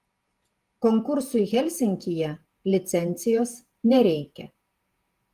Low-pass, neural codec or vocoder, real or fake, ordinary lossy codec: 14.4 kHz; vocoder, 48 kHz, 128 mel bands, Vocos; fake; Opus, 24 kbps